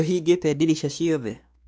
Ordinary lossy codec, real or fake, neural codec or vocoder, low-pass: none; fake; codec, 16 kHz, 2 kbps, X-Codec, WavLM features, trained on Multilingual LibriSpeech; none